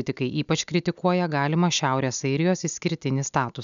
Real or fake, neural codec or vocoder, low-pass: real; none; 7.2 kHz